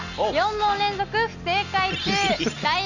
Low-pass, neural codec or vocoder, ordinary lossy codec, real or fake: 7.2 kHz; none; none; real